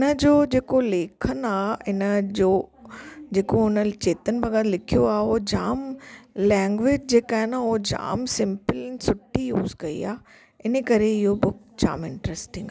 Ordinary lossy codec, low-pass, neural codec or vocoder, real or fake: none; none; none; real